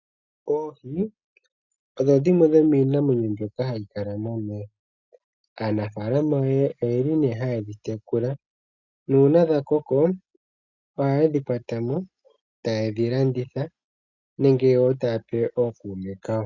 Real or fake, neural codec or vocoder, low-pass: real; none; 7.2 kHz